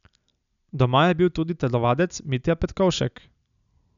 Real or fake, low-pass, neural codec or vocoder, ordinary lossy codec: real; 7.2 kHz; none; none